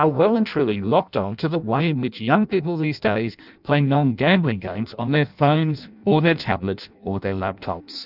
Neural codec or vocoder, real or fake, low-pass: codec, 16 kHz in and 24 kHz out, 0.6 kbps, FireRedTTS-2 codec; fake; 5.4 kHz